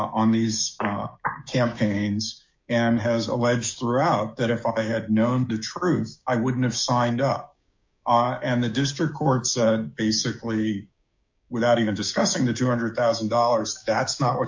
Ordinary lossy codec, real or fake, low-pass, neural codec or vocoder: MP3, 48 kbps; fake; 7.2 kHz; codec, 16 kHz, 6 kbps, DAC